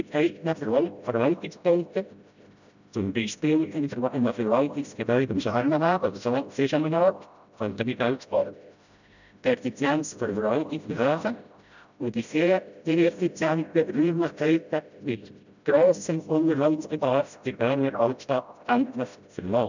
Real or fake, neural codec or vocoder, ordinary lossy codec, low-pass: fake; codec, 16 kHz, 0.5 kbps, FreqCodec, smaller model; none; 7.2 kHz